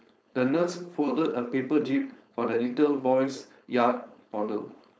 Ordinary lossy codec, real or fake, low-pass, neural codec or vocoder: none; fake; none; codec, 16 kHz, 4.8 kbps, FACodec